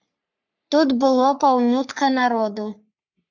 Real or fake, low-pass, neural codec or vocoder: fake; 7.2 kHz; codec, 44.1 kHz, 3.4 kbps, Pupu-Codec